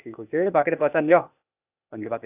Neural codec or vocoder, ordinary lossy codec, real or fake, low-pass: codec, 16 kHz, 0.8 kbps, ZipCodec; none; fake; 3.6 kHz